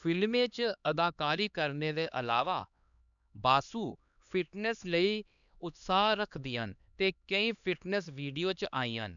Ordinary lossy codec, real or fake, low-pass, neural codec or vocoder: none; fake; 7.2 kHz; codec, 16 kHz, 2 kbps, X-Codec, HuBERT features, trained on LibriSpeech